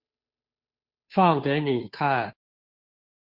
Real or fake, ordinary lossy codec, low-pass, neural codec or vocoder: fake; MP3, 48 kbps; 5.4 kHz; codec, 16 kHz, 2 kbps, FunCodec, trained on Chinese and English, 25 frames a second